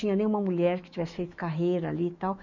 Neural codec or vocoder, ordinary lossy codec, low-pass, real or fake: autoencoder, 48 kHz, 128 numbers a frame, DAC-VAE, trained on Japanese speech; none; 7.2 kHz; fake